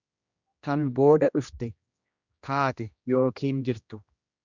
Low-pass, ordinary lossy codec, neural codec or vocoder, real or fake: 7.2 kHz; Opus, 64 kbps; codec, 16 kHz, 1 kbps, X-Codec, HuBERT features, trained on general audio; fake